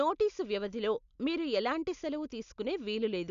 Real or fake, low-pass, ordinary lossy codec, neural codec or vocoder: real; 7.2 kHz; none; none